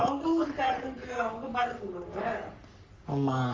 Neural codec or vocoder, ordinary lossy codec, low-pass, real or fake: codec, 44.1 kHz, 3.4 kbps, Pupu-Codec; Opus, 32 kbps; 7.2 kHz; fake